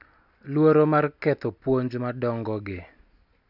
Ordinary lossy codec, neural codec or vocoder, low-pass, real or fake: none; none; 5.4 kHz; real